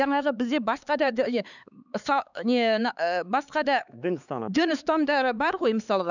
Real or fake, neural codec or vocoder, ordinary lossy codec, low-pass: fake; codec, 16 kHz, 4 kbps, X-Codec, HuBERT features, trained on LibriSpeech; none; 7.2 kHz